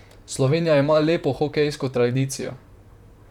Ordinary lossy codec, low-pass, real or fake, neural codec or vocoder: none; 19.8 kHz; fake; vocoder, 44.1 kHz, 128 mel bands, Pupu-Vocoder